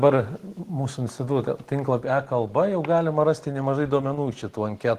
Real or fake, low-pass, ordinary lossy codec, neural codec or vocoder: real; 14.4 kHz; Opus, 16 kbps; none